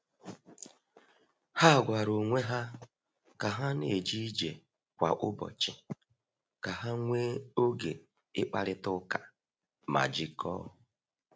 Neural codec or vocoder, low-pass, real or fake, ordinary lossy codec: none; none; real; none